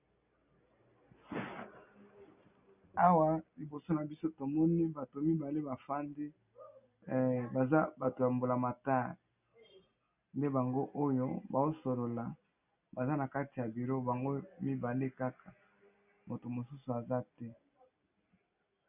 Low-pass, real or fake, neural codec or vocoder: 3.6 kHz; real; none